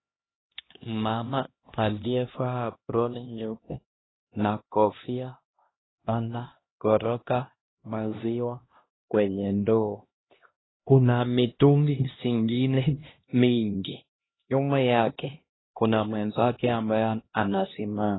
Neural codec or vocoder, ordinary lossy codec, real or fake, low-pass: codec, 16 kHz, 1 kbps, X-Codec, HuBERT features, trained on LibriSpeech; AAC, 16 kbps; fake; 7.2 kHz